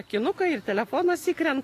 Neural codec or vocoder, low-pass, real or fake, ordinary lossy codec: none; 14.4 kHz; real; AAC, 48 kbps